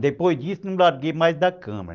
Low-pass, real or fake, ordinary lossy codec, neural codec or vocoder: 7.2 kHz; real; Opus, 24 kbps; none